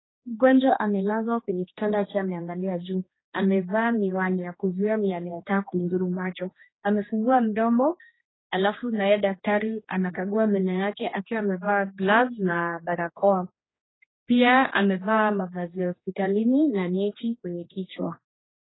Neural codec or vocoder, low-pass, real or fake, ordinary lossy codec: codec, 16 kHz, 2 kbps, X-Codec, HuBERT features, trained on general audio; 7.2 kHz; fake; AAC, 16 kbps